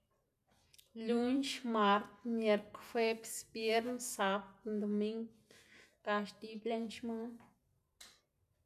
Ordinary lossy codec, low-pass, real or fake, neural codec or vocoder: none; 14.4 kHz; real; none